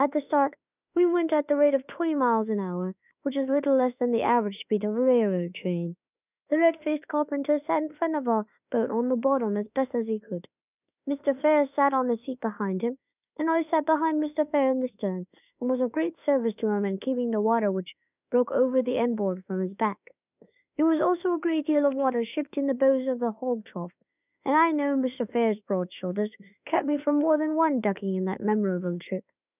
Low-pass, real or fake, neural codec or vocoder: 3.6 kHz; fake; autoencoder, 48 kHz, 32 numbers a frame, DAC-VAE, trained on Japanese speech